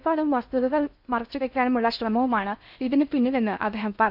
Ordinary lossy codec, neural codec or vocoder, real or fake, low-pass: none; codec, 16 kHz in and 24 kHz out, 0.6 kbps, FocalCodec, streaming, 2048 codes; fake; 5.4 kHz